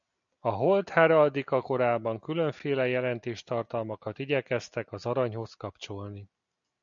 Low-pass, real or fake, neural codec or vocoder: 7.2 kHz; real; none